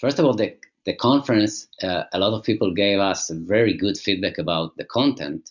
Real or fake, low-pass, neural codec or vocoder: real; 7.2 kHz; none